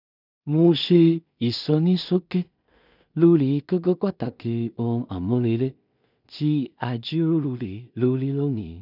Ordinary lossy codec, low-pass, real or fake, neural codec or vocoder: none; 5.4 kHz; fake; codec, 16 kHz in and 24 kHz out, 0.4 kbps, LongCat-Audio-Codec, two codebook decoder